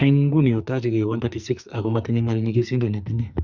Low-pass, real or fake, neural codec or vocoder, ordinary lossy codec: 7.2 kHz; fake; codec, 44.1 kHz, 2.6 kbps, SNAC; none